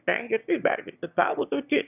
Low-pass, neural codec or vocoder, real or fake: 3.6 kHz; autoencoder, 22.05 kHz, a latent of 192 numbers a frame, VITS, trained on one speaker; fake